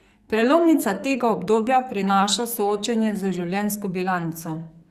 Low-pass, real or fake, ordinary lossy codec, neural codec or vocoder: 14.4 kHz; fake; Opus, 64 kbps; codec, 44.1 kHz, 2.6 kbps, SNAC